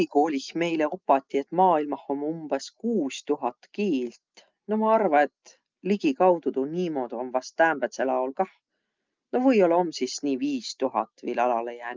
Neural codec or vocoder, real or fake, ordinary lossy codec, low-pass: none; real; Opus, 24 kbps; 7.2 kHz